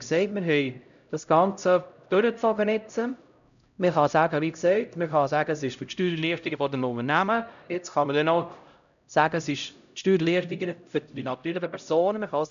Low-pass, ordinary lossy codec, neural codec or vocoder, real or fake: 7.2 kHz; none; codec, 16 kHz, 0.5 kbps, X-Codec, HuBERT features, trained on LibriSpeech; fake